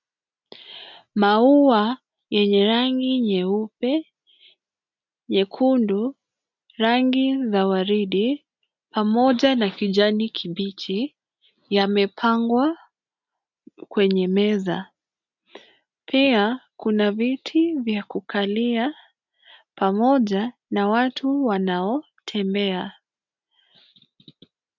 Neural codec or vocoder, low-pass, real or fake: none; 7.2 kHz; real